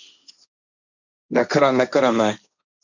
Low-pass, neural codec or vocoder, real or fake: 7.2 kHz; codec, 16 kHz, 1.1 kbps, Voila-Tokenizer; fake